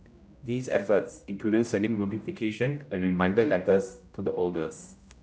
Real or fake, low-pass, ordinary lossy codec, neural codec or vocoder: fake; none; none; codec, 16 kHz, 0.5 kbps, X-Codec, HuBERT features, trained on general audio